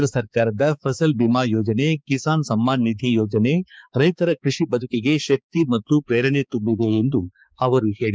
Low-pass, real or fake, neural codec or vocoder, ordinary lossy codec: none; fake; codec, 16 kHz, 4 kbps, X-Codec, HuBERT features, trained on balanced general audio; none